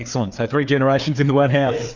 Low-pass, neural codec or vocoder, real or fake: 7.2 kHz; codec, 16 kHz, 4 kbps, FreqCodec, larger model; fake